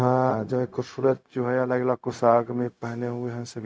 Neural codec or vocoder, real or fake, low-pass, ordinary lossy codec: codec, 16 kHz, 0.4 kbps, LongCat-Audio-Codec; fake; none; none